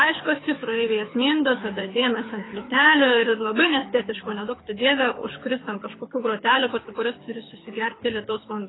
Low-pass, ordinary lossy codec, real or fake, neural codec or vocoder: 7.2 kHz; AAC, 16 kbps; fake; codec, 24 kHz, 6 kbps, HILCodec